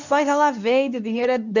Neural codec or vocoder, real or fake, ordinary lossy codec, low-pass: codec, 24 kHz, 0.9 kbps, WavTokenizer, medium speech release version 2; fake; none; 7.2 kHz